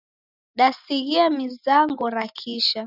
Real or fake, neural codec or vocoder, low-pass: real; none; 5.4 kHz